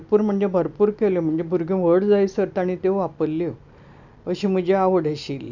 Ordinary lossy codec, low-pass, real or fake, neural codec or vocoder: none; 7.2 kHz; real; none